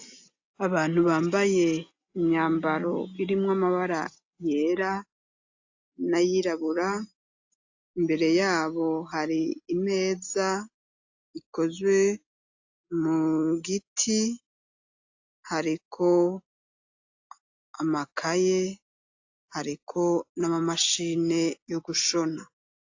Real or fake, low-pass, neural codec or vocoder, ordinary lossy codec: real; 7.2 kHz; none; AAC, 48 kbps